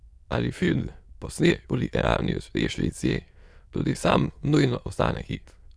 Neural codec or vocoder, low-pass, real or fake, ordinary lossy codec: autoencoder, 22.05 kHz, a latent of 192 numbers a frame, VITS, trained on many speakers; none; fake; none